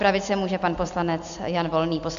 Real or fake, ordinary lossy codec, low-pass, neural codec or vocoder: real; AAC, 96 kbps; 7.2 kHz; none